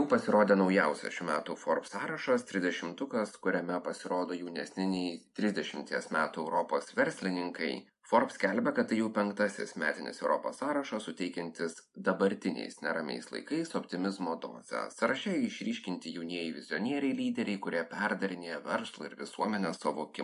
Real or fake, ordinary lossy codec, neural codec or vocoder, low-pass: real; MP3, 64 kbps; none; 10.8 kHz